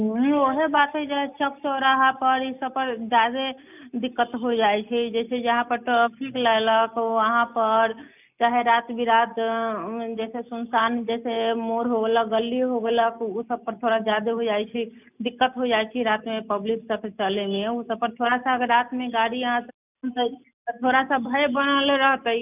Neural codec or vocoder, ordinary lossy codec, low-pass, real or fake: none; none; 3.6 kHz; real